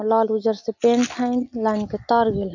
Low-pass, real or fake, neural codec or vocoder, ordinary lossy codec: 7.2 kHz; real; none; none